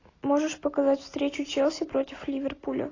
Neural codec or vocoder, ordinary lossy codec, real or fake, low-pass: none; AAC, 32 kbps; real; 7.2 kHz